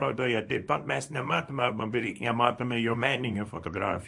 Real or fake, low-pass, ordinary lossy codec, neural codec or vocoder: fake; 10.8 kHz; MP3, 48 kbps; codec, 24 kHz, 0.9 kbps, WavTokenizer, small release